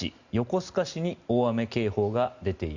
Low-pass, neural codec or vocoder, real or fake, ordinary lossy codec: 7.2 kHz; none; real; Opus, 64 kbps